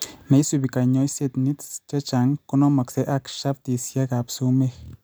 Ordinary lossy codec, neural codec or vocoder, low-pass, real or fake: none; none; none; real